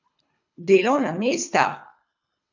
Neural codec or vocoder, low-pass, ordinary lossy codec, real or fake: codec, 24 kHz, 3 kbps, HILCodec; 7.2 kHz; none; fake